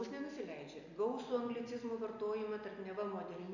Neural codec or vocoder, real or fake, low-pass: none; real; 7.2 kHz